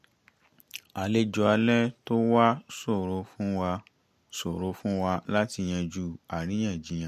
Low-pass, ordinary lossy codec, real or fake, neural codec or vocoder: 14.4 kHz; MP3, 64 kbps; real; none